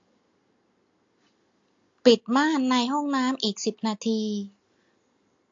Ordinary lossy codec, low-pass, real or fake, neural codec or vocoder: AAC, 48 kbps; 7.2 kHz; real; none